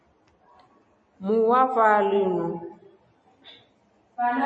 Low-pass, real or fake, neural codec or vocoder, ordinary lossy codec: 9.9 kHz; real; none; MP3, 32 kbps